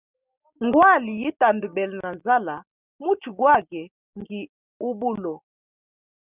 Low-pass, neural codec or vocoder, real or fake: 3.6 kHz; none; real